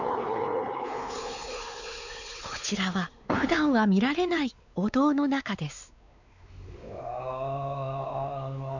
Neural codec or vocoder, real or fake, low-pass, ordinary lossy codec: codec, 16 kHz, 4 kbps, X-Codec, WavLM features, trained on Multilingual LibriSpeech; fake; 7.2 kHz; none